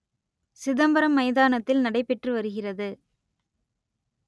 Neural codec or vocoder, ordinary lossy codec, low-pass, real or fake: none; none; none; real